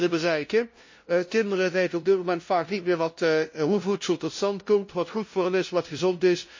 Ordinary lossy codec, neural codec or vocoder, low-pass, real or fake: MP3, 32 kbps; codec, 16 kHz, 0.5 kbps, FunCodec, trained on LibriTTS, 25 frames a second; 7.2 kHz; fake